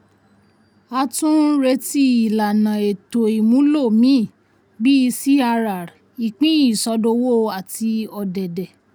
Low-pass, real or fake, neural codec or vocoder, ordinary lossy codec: none; real; none; none